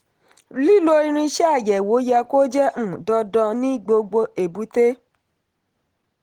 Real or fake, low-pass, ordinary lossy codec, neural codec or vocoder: real; 19.8 kHz; Opus, 16 kbps; none